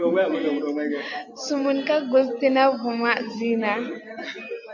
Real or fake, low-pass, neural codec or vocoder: real; 7.2 kHz; none